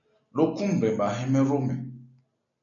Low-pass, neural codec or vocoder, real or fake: 7.2 kHz; none; real